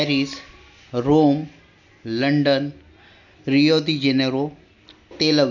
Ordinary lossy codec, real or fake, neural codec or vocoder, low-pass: none; real; none; 7.2 kHz